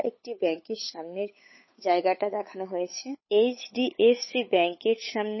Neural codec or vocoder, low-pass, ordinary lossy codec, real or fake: codec, 16 kHz, 8 kbps, FunCodec, trained on Chinese and English, 25 frames a second; 7.2 kHz; MP3, 24 kbps; fake